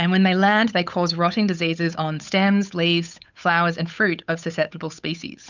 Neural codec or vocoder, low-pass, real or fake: codec, 16 kHz, 8 kbps, FunCodec, trained on Chinese and English, 25 frames a second; 7.2 kHz; fake